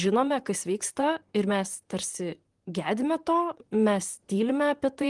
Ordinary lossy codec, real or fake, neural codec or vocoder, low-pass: Opus, 24 kbps; fake; vocoder, 44.1 kHz, 128 mel bands, Pupu-Vocoder; 10.8 kHz